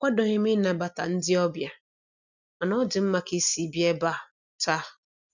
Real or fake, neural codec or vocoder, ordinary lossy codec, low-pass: real; none; none; 7.2 kHz